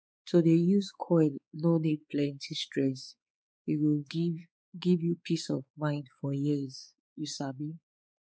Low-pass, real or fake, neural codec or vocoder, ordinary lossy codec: none; fake; codec, 16 kHz, 4 kbps, X-Codec, WavLM features, trained on Multilingual LibriSpeech; none